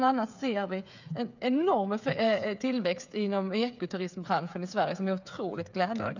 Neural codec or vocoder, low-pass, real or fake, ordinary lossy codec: codec, 16 kHz, 8 kbps, FreqCodec, smaller model; 7.2 kHz; fake; none